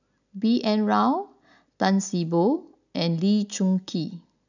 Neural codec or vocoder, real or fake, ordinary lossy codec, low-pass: none; real; none; 7.2 kHz